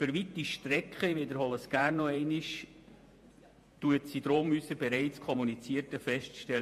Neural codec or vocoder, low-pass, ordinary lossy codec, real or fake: vocoder, 48 kHz, 128 mel bands, Vocos; 14.4 kHz; MP3, 64 kbps; fake